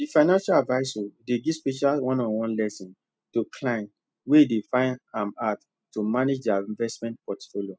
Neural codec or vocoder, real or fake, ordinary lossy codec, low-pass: none; real; none; none